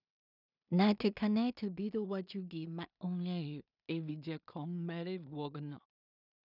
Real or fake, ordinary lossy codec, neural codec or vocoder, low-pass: fake; none; codec, 16 kHz in and 24 kHz out, 0.4 kbps, LongCat-Audio-Codec, two codebook decoder; 5.4 kHz